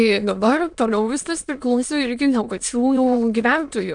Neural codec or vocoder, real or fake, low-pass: autoencoder, 22.05 kHz, a latent of 192 numbers a frame, VITS, trained on many speakers; fake; 9.9 kHz